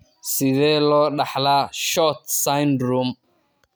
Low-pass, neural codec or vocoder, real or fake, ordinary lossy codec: none; none; real; none